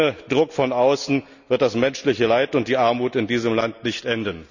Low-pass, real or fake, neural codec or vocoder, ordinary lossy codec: 7.2 kHz; real; none; none